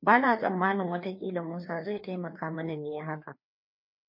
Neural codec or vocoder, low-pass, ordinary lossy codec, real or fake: codec, 16 kHz, 2 kbps, FreqCodec, larger model; 5.4 kHz; MP3, 32 kbps; fake